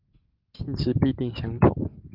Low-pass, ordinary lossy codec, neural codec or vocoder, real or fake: 5.4 kHz; Opus, 24 kbps; none; real